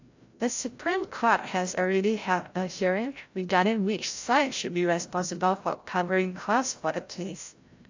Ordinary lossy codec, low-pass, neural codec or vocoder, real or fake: AAC, 48 kbps; 7.2 kHz; codec, 16 kHz, 0.5 kbps, FreqCodec, larger model; fake